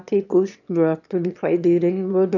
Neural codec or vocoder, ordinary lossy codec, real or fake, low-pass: autoencoder, 22.05 kHz, a latent of 192 numbers a frame, VITS, trained on one speaker; none; fake; 7.2 kHz